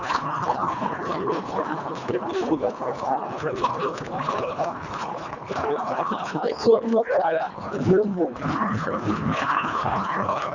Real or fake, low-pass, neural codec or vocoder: fake; 7.2 kHz; codec, 24 kHz, 1.5 kbps, HILCodec